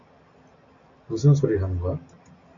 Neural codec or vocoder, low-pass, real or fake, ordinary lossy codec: none; 7.2 kHz; real; AAC, 64 kbps